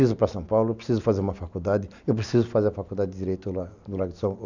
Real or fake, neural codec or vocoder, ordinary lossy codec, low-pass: real; none; none; 7.2 kHz